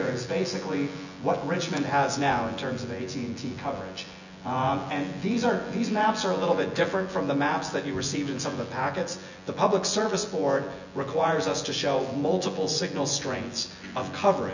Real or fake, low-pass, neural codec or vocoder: fake; 7.2 kHz; vocoder, 24 kHz, 100 mel bands, Vocos